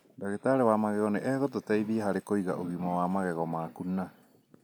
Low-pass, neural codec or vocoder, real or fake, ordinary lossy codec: none; none; real; none